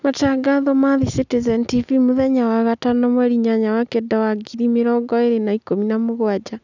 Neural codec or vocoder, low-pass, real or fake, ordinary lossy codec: none; 7.2 kHz; real; none